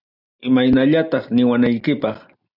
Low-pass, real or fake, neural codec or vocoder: 5.4 kHz; real; none